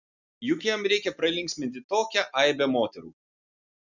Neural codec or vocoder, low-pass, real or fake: none; 7.2 kHz; real